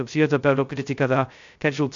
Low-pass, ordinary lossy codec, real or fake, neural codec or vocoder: 7.2 kHz; AAC, 64 kbps; fake; codec, 16 kHz, 0.2 kbps, FocalCodec